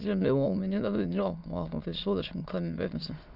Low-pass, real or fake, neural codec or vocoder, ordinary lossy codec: 5.4 kHz; fake; autoencoder, 22.05 kHz, a latent of 192 numbers a frame, VITS, trained on many speakers; none